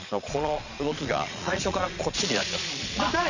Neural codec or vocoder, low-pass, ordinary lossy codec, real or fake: vocoder, 22.05 kHz, 80 mel bands, Vocos; 7.2 kHz; none; fake